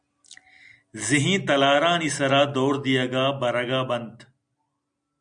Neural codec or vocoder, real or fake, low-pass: none; real; 9.9 kHz